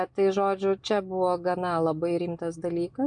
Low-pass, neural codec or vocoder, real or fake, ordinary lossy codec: 9.9 kHz; none; real; MP3, 96 kbps